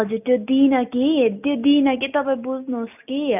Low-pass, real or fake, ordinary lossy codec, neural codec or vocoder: 3.6 kHz; real; none; none